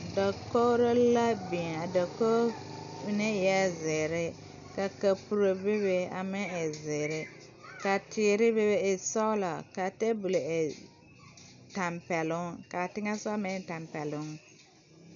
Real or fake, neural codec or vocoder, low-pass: real; none; 7.2 kHz